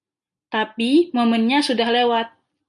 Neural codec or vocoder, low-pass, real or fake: none; 10.8 kHz; real